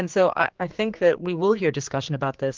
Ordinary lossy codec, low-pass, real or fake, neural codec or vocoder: Opus, 32 kbps; 7.2 kHz; fake; codec, 16 kHz, 2 kbps, X-Codec, HuBERT features, trained on general audio